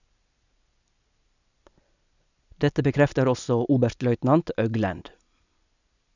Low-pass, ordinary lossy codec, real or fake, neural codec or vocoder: 7.2 kHz; none; real; none